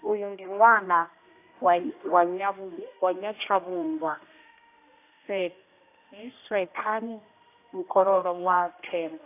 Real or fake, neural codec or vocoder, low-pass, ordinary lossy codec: fake; codec, 16 kHz, 1 kbps, X-Codec, HuBERT features, trained on general audio; 3.6 kHz; AAC, 24 kbps